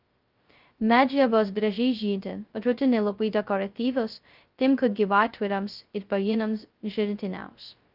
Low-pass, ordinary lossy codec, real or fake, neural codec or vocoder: 5.4 kHz; Opus, 24 kbps; fake; codec, 16 kHz, 0.2 kbps, FocalCodec